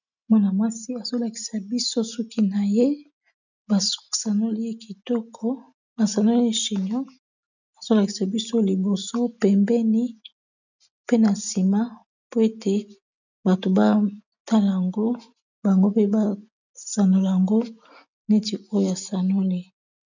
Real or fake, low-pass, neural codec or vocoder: real; 7.2 kHz; none